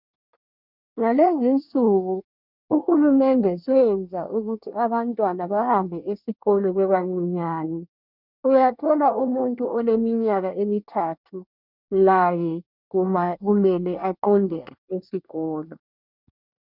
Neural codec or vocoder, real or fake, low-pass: codec, 24 kHz, 1 kbps, SNAC; fake; 5.4 kHz